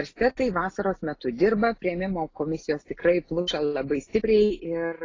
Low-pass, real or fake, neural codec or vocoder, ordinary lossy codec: 7.2 kHz; real; none; AAC, 32 kbps